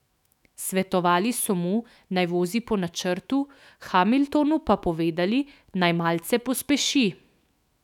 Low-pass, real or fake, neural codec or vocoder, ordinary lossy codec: 19.8 kHz; fake; autoencoder, 48 kHz, 128 numbers a frame, DAC-VAE, trained on Japanese speech; none